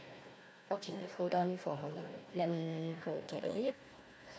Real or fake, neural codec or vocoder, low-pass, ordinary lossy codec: fake; codec, 16 kHz, 1 kbps, FunCodec, trained on Chinese and English, 50 frames a second; none; none